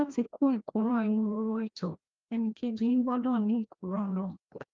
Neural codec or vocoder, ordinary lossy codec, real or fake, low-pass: codec, 16 kHz, 1 kbps, FreqCodec, larger model; Opus, 32 kbps; fake; 7.2 kHz